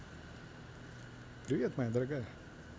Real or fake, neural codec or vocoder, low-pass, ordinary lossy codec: real; none; none; none